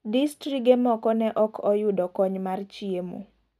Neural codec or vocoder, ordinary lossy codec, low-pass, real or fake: none; none; 14.4 kHz; real